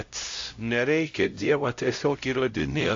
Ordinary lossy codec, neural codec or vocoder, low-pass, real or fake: AAC, 48 kbps; codec, 16 kHz, 0.5 kbps, X-Codec, HuBERT features, trained on LibriSpeech; 7.2 kHz; fake